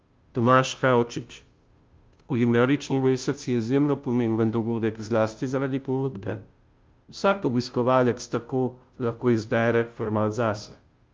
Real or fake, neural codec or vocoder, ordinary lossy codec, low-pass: fake; codec, 16 kHz, 0.5 kbps, FunCodec, trained on Chinese and English, 25 frames a second; Opus, 24 kbps; 7.2 kHz